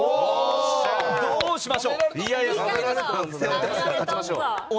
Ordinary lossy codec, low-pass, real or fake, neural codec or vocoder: none; none; real; none